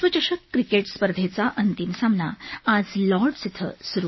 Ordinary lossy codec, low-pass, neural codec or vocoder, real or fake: MP3, 24 kbps; 7.2 kHz; none; real